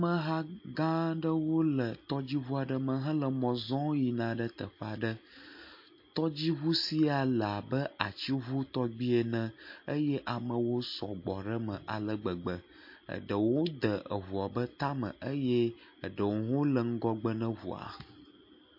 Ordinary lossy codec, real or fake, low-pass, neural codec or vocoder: MP3, 32 kbps; real; 5.4 kHz; none